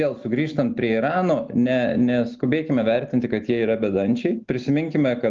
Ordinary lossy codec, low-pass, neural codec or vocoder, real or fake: Opus, 32 kbps; 7.2 kHz; none; real